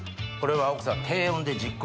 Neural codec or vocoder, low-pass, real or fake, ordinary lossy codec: none; none; real; none